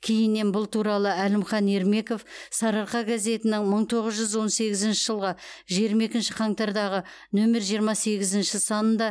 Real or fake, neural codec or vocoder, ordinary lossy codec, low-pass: real; none; none; none